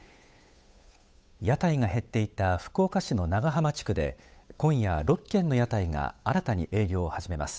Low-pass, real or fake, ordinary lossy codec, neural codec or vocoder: none; fake; none; codec, 16 kHz, 8 kbps, FunCodec, trained on Chinese and English, 25 frames a second